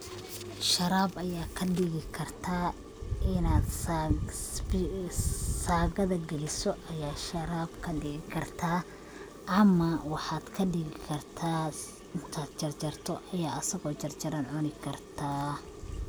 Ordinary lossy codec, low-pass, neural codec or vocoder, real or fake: none; none; none; real